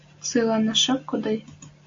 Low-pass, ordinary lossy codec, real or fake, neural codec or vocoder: 7.2 kHz; AAC, 48 kbps; real; none